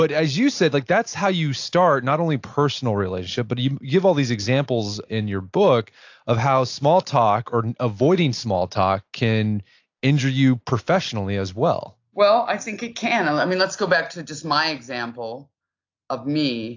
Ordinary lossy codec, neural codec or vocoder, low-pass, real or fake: AAC, 48 kbps; none; 7.2 kHz; real